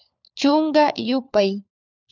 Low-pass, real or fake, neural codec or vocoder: 7.2 kHz; fake; codec, 16 kHz, 4 kbps, FunCodec, trained on LibriTTS, 50 frames a second